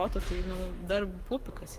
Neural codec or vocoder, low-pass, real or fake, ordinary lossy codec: codec, 44.1 kHz, 7.8 kbps, Pupu-Codec; 14.4 kHz; fake; Opus, 32 kbps